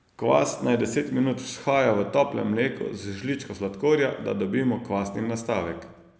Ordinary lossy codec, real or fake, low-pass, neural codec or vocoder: none; real; none; none